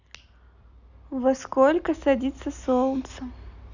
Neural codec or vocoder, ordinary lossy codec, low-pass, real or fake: none; none; 7.2 kHz; real